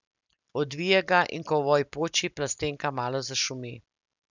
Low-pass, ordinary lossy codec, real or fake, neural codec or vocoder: 7.2 kHz; none; real; none